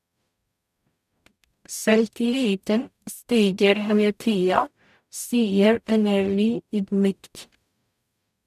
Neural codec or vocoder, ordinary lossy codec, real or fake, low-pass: codec, 44.1 kHz, 0.9 kbps, DAC; none; fake; 14.4 kHz